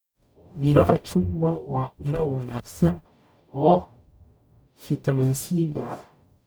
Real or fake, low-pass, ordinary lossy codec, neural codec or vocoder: fake; none; none; codec, 44.1 kHz, 0.9 kbps, DAC